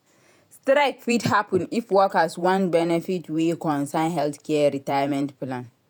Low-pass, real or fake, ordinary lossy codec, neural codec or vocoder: none; fake; none; vocoder, 48 kHz, 128 mel bands, Vocos